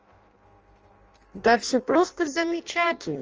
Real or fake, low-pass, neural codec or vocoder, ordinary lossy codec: fake; 7.2 kHz; codec, 16 kHz in and 24 kHz out, 0.6 kbps, FireRedTTS-2 codec; Opus, 24 kbps